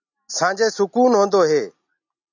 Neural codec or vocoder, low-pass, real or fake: none; 7.2 kHz; real